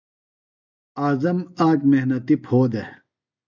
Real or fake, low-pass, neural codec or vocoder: real; 7.2 kHz; none